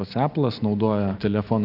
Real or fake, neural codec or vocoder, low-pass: real; none; 5.4 kHz